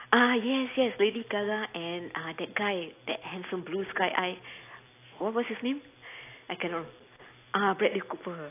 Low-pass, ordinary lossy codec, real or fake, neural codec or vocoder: 3.6 kHz; AAC, 24 kbps; real; none